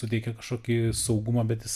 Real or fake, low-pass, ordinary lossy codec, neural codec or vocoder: real; 14.4 kHz; MP3, 96 kbps; none